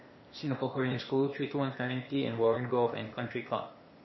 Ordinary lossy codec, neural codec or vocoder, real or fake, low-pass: MP3, 24 kbps; codec, 16 kHz, 0.8 kbps, ZipCodec; fake; 7.2 kHz